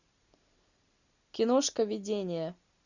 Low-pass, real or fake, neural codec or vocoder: 7.2 kHz; real; none